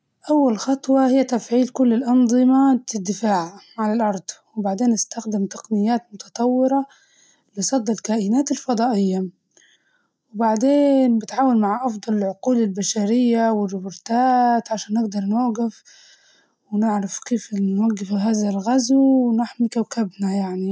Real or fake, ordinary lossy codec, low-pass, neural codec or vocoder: real; none; none; none